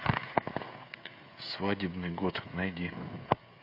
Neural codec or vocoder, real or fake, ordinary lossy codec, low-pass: codec, 16 kHz, 16 kbps, FreqCodec, smaller model; fake; MP3, 32 kbps; 5.4 kHz